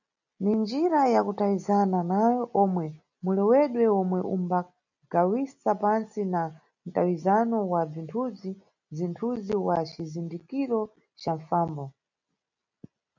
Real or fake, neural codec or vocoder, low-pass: real; none; 7.2 kHz